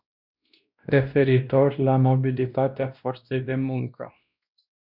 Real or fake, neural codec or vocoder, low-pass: fake; codec, 16 kHz, 1 kbps, X-Codec, WavLM features, trained on Multilingual LibriSpeech; 5.4 kHz